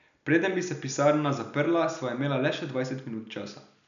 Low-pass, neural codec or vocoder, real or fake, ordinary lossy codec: 7.2 kHz; none; real; none